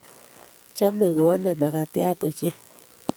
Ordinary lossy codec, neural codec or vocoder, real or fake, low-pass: none; codec, 44.1 kHz, 2.6 kbps, SNAC; fake; none